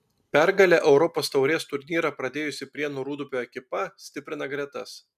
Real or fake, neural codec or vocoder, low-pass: real; none; 14.4 kHz